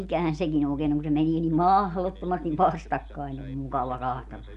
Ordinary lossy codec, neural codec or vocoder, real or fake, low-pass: none; none; real; 10.8 kHz